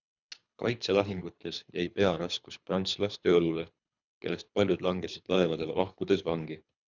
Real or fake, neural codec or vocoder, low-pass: fake; codec, 24 kHz, 3 kbps, HILCodec; 7.2 kHz